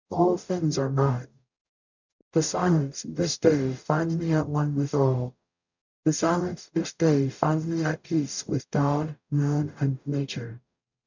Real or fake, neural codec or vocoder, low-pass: fake; codec, 44.1 kHz, 0.9 kbps, DAC; 7.2 kHz